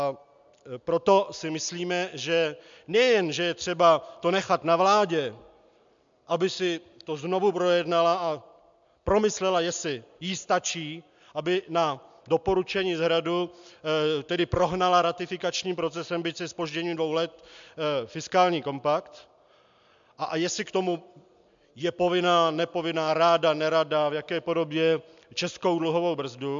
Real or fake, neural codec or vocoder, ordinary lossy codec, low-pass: real; none; MP3, 64 kbps; 7.2 kHz